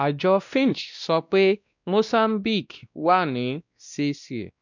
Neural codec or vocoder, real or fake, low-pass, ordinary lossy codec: codec, 16 kHz, 1 kbps, X-Codec, WavLM features, trained on Multilingual LibriSpeech; fake; 7.2 kHz; none